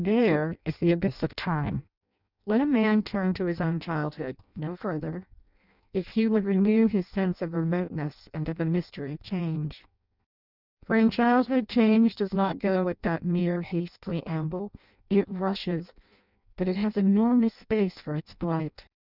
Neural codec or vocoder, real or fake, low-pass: codec, 16 kHz in and 24 kHz out, 0.6 kbps, FireRedTTS-2 codec; fake; 5.4 kHz